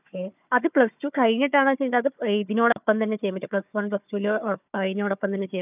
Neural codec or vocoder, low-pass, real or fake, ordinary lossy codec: codec, 16 kHz, 4 kbps, FunCodec, trained on Chinese and English, 50 frames a second; 3.6 kHz; fake; none